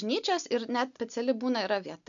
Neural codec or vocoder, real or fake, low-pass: none; real; 7.2 kHz